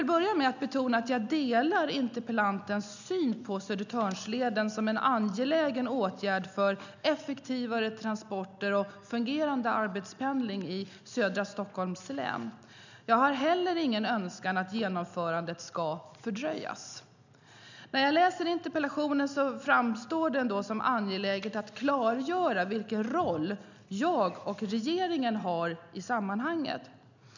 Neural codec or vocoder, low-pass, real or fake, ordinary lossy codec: none; 7.2 kHz; real; none